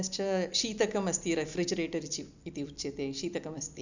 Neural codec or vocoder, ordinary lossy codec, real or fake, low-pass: none; none; real; 7.2 kHz